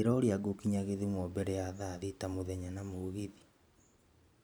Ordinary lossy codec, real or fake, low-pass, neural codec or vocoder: none; fake; none; vocoder, 44.1 kHz, 128 mel bands every 512 samples, BigVGAN v2